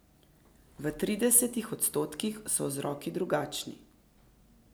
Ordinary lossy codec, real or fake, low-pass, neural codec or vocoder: none; real; none; none